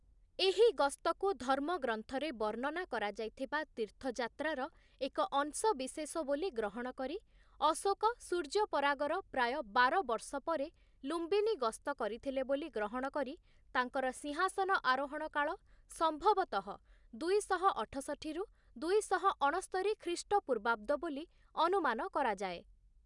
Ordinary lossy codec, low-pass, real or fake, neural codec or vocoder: none; 10.8 kHz; real; none